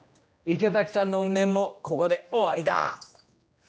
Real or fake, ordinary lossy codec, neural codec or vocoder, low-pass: fake; none; codec, 16 kHz, 1 kbps, X-Codec, HuBERT features, trained on general audio; none